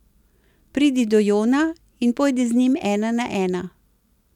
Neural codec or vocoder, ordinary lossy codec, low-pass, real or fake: none; none; 19.8 kHz; real